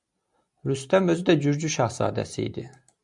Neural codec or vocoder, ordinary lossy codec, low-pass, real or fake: none; MP3, 96 kbps; 10.8 kHz; real